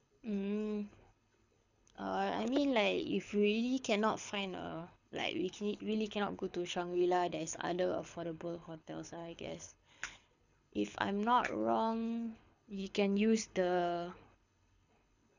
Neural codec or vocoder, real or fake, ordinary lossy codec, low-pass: codec, 24 kHz, 6 kbps, HILCodec; fake; none; 7.2 kHz